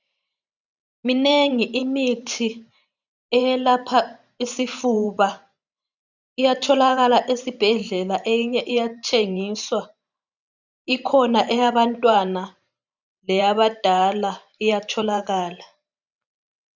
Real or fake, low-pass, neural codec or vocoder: fake; 7.2 kHz; vocoder, 44.1 kHz, 128 mel bands every 512 samples, BigVGAN v2